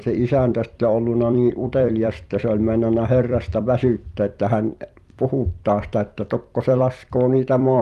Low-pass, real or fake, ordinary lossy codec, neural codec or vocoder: 14.4 kHz; fake; Opus, 32 kbps; vocoder, 44.1 kHz, 128 mel bands every 256 samples, BigVGAN v2